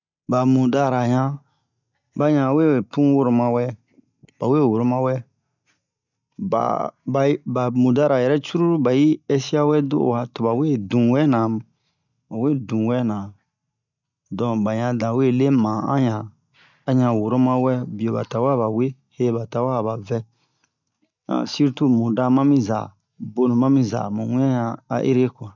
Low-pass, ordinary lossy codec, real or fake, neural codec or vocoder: 7.2 kHz; none; real; none